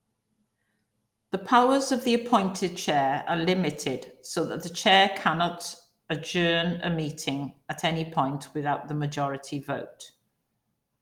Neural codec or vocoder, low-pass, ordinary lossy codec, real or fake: none; 19.8 kHz; Opus, 24 kbps; real